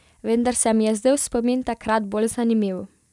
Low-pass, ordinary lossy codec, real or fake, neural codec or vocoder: none; none; real; none